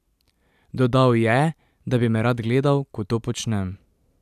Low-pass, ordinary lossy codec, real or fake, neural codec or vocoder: 14.4 kHz; none; fake; vocoder, 44.1 kHz, 128 mel bands every 512 samples, BigVGAN v2